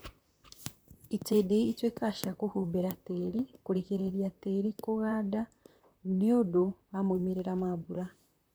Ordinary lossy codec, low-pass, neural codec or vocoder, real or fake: none; none; vocoder, 44.1 kHz, 128 mel bands, Pupu-Vocoder; fake